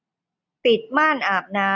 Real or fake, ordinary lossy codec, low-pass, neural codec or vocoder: real; none; 7.2 kHz; none